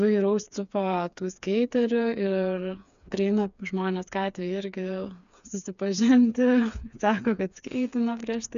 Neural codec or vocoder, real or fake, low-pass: codec, 16 kHz, 4 kbps, FreqCodec, smaller model; fake; 7.2 kHz